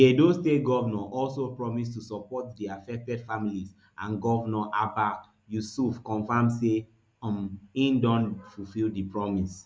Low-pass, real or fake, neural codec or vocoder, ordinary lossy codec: none; real; none; none